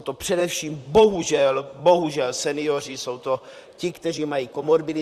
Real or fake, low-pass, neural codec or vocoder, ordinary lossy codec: fake; 14.4 kHz; vocoder, 44.1 kHz, 128 mel bands, Pupu-Vocoder; Opus, 64 kbps